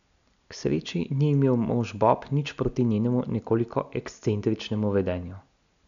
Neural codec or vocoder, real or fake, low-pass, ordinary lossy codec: none; real; 7.2 kHz; none